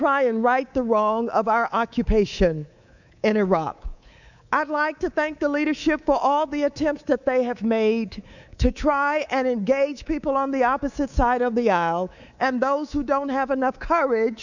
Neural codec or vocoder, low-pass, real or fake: codec, 24 kHz, 3.1 kbps, DualCodec; 7.2 kHz; fake